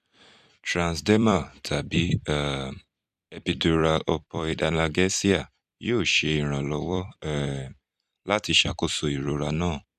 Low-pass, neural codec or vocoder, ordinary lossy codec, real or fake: 14.4 kHz; vocoder, 44.1 kHz, 128 mel bands every 256 samples, BigVGAN v2; none; fake